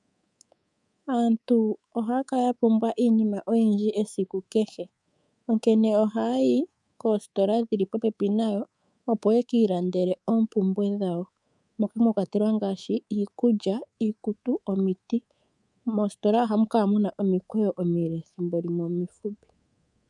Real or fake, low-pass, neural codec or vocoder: fake; 10.8 kHz; codec, 24 kHz, 3.1 kbps, DualCodec